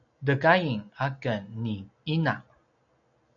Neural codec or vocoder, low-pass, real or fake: none; 7.2 kHz; real